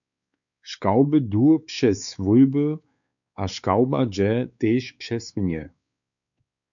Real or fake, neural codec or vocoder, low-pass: fake; codec, 16 kHz, 4 kbps, X-Codec, WavLM features, trained on Multilingual LibriSpeech; 7.2 kHz